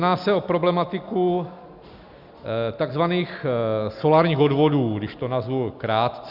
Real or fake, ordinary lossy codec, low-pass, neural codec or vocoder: real; Opus, 64 kbps; 5.4 kHz; none